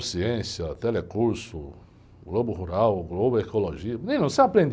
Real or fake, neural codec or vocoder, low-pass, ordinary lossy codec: real; none; none; none